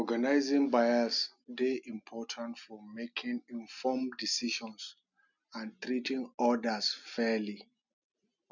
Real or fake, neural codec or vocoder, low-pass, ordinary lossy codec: real; none; 7.2 kHz; none